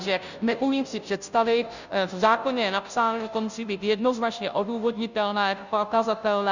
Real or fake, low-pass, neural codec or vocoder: fake; 7.2 kHz; codec, 16 kHz, 0.5 kbps, FunCodec, trained on Chinese and English, 25 frames a second